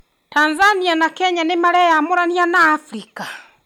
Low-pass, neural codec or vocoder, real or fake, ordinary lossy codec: 19.8 kHz; none; real; none